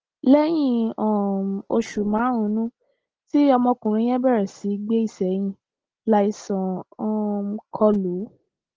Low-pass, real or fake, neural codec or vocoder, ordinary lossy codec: 7.2 kHz; real; none; Opus, 16 kbps